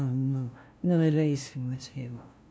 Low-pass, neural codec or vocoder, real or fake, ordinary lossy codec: none; codec, 16 kHz, 0.5 kbps, FunCodec, trained on LibriTTS, 25 frames a second; fake; none